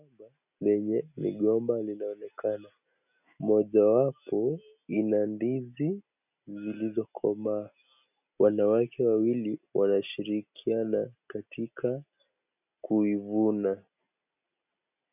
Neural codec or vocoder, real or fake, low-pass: none; real; 3.6 kHz